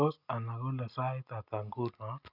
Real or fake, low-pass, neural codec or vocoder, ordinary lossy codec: real; 5.4 kHz; none; none